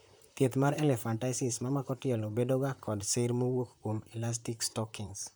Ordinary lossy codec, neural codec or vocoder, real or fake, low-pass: none; vocoder, 44.1 kHz, 128 mel bands, Pupu-Vocoder; fake; none